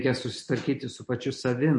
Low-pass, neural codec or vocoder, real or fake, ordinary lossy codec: 10.8 kHz; none; real; MP3, 48 kbps